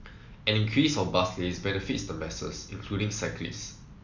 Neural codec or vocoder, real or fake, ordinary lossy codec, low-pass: none; real; none; 7.2 kHz